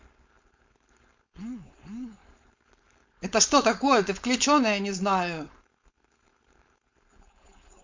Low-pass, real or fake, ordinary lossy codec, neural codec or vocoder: 7.2 kHz; fake; MP3, 48 kbps; codec, 16 kHz, 4.8 kbps, FACodec